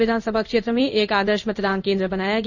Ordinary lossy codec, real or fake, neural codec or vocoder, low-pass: none; fake; codec, 16 kHz in and 24 kHz out, 1 kbps, XY-Tokenizer; 7.2 kHz